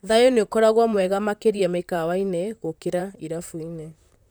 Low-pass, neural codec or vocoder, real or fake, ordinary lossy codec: none; vocoder, 44.1 kHz, 128 mel bands, Pupu-Vocoder; fake; none